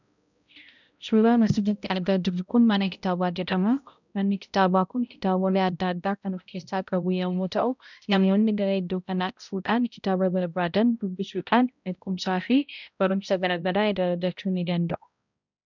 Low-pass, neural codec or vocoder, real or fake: 7.2 kHz; codec, 16 kHz, 0.5 kbps, X-Codec, HuBERT features, trained on balanced general audio; fake